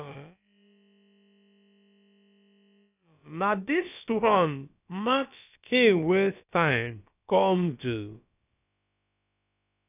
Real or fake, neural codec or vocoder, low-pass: fake; codec, 16 kHz, about 1 kbps, DyCAST, with the encoder's durations; 3.6 kHz